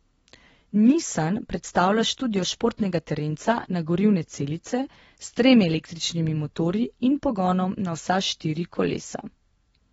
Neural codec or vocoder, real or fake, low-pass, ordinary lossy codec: none; real; 19.8 kHz; AAC, 24 kbps